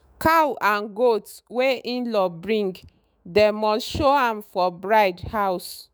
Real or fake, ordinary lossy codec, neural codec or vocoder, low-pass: fake; none; autoencoder, 48 kHz, 128 numbers a frame, DAC-VAE, trained on Japanese speech; none